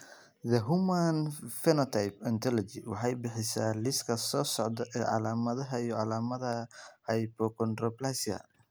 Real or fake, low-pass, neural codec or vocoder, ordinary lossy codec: real; none; none; none